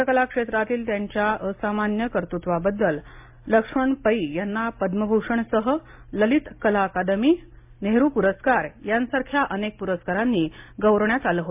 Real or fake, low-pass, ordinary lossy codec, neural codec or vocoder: real; 3.6 kHz; MP3, 32 kbps; none